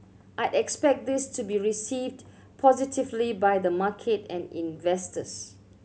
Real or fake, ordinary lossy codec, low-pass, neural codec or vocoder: real; none; none; none